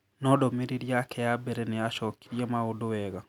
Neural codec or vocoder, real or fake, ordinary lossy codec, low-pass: none; real; none; 19.8 kHz